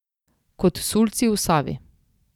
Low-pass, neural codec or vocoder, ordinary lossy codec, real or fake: 19.8 kHz; none; none; real